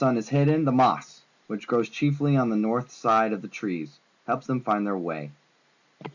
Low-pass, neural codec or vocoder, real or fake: 7.2 kHz; none; real